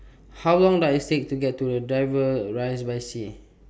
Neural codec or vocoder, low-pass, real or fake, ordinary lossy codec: none; none; real; none